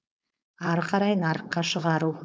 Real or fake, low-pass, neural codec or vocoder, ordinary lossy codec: fake; none; codec, 16 kHz, 4.8 kbps, FACodec; none